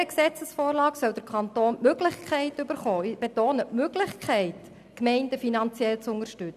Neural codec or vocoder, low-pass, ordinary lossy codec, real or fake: none; 14.4 kHz; none; real